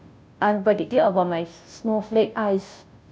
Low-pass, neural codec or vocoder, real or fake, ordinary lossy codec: none; codec, 16 kHz, 0.5 kbps, FunCodec, trained on Chinese and English, 25 frames a second; fake; none